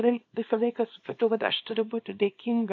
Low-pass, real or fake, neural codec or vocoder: 7.2 kHz; fake; codec, 24 kHz, 0.9 kbps, WavTokenizer, small release